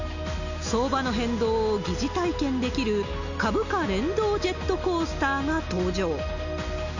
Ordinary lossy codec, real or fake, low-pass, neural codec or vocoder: none; real; 7.2 kHz; none